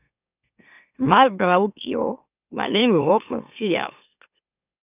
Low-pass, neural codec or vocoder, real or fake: 3.6 kHz; autoencoder, 44.1 kHz, a latent of 192 numbers a frame, MeloTTS; fake